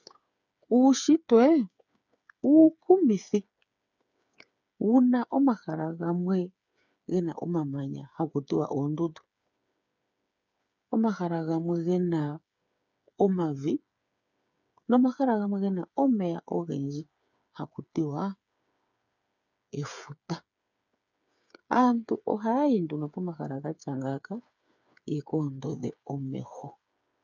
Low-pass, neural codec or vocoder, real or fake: 7.2 kHz; codec, 16 kHz, 8 kbps, FreqCodec, smaller model; fake